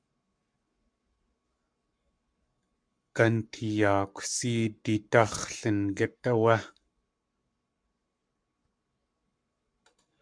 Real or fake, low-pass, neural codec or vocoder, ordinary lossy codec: fake; 9.9 kHz; codec, 44.1 kHz, 7.8 kbps, Pupu-Codec; MP3, 96 kbps